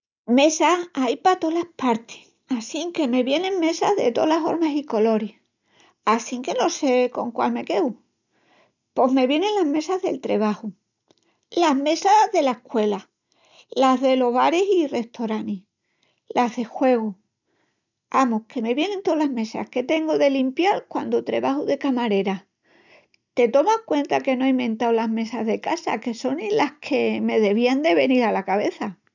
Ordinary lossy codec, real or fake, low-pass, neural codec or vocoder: none; real; 7.2 kHz; none